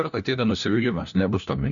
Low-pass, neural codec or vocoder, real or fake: 7.2 kHz; codec, 16 kHz, 1 kbps, FunCodec, trained on LibriTTS, 50 frames a second; fake